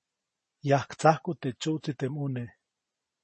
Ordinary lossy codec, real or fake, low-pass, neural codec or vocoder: MP3, 32 kbps; real; 10.8 kHz; none